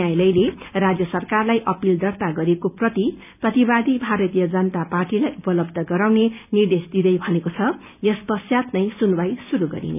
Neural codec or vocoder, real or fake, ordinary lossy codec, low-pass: none; real; none; 3.6 kHz